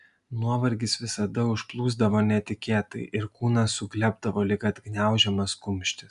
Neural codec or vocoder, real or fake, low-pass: none; real; 10.8 kHz